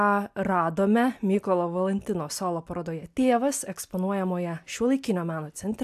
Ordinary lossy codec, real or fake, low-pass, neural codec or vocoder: AAC, 96 kbps; real; 14.4 kHz; none